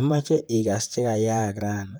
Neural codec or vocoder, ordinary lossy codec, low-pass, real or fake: vocoder, 44.1 kHz, 128 mel bands, Pupu-Vocoder; none; none; fake